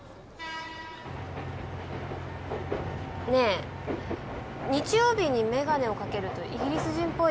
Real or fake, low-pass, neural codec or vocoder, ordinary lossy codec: real; none; none; none